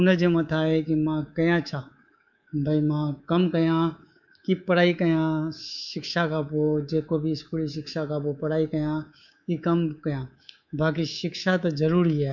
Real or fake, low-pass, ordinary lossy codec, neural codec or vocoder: fake; 7.2 kHz; none; codec, 24 kHz, 3.1 kbps, DualCodec